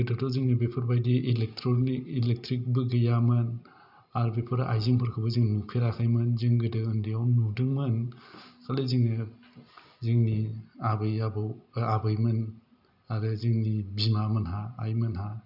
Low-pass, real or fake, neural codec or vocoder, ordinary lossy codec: 5.4 kHz; real; none; none